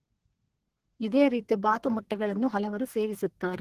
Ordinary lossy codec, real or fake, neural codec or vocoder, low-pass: Opus, 16 kbps; fake; codec, 32 kHz, 1.9 kbps, SNAC; 14.4 kHz